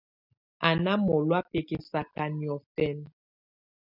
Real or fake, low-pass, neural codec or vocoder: real; 5.4 kHz; none